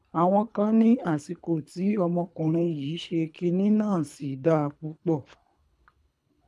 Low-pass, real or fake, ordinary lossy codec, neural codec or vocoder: none; fake; none; codec, 24 kHz, 3 kbps, HILCodec